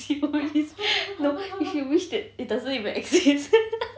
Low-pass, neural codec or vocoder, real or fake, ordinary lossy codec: none; none; real; none